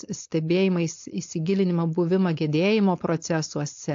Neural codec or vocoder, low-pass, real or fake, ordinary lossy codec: codec, 16 kHz, 4.8 kbps, FACodec; 7.2 kHz; fake; AAC, 48 kbps